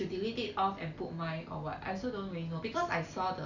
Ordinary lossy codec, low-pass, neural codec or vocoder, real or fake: none; 7.2 kHz; none; real